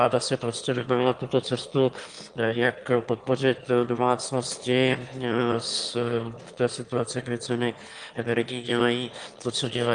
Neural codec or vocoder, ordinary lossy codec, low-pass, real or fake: autoencoder, 22.05 kHz, a latent of 192 numbers a frame, VITS, trained on one speaker; Opus, 32 kbps; 9.9 kHz; fake